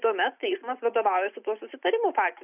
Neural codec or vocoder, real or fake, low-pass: none; real; 3.6 kHz